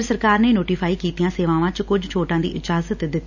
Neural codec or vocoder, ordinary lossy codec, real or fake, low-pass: none; none; real; 7.2 kHz